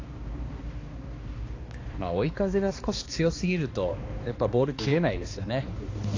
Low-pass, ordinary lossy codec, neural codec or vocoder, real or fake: 7.2 kHz; AAC, 32 kbps; codec, 16 kHz, 2 kbps, X-Codec, HuBERT features, trained on balanced general audio; fake